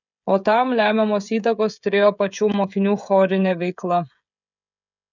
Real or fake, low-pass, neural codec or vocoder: fake; 7.2 kHz; codec, 16 kHz, 16 kbps, FreqCodec, smaller model